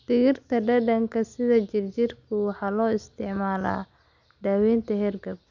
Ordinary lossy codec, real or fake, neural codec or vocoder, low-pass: none; real; none; 7.2 kHz